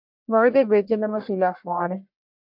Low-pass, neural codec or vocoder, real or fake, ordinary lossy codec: 5.4 kHz; codec, 44.1 kHz, 1.7 kbps, Pupu-Codec; fake; MP3, 48 kbps